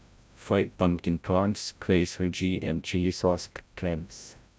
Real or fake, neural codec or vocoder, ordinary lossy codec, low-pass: fake; codec, 16 kHz, 0.5 kbps, FreqCodec, larger model; none; none